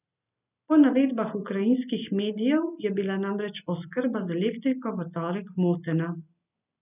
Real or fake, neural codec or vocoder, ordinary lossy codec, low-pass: real; none; none; 3.6 kHz